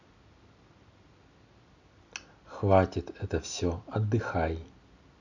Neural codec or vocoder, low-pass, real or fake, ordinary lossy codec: none; 7.2 kHz; real; none